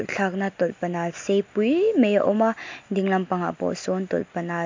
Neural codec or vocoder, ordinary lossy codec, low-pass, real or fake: none; MP3, 48 kbps; 7.2 kHz; real